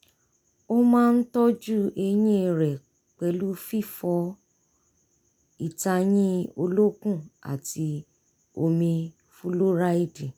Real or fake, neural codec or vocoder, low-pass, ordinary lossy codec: real; none; none; none